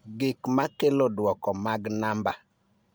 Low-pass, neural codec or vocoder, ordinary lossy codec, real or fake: none; none; none; real